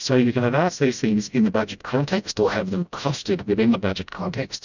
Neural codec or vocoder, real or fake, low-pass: codec, 16 kHz, 0.5 kbps, FreqCodec, smaller model; fake; 7.2 kHz